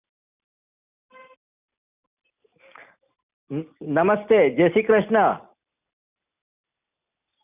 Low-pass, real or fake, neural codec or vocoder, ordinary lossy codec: 3.6 kHz; real; none; none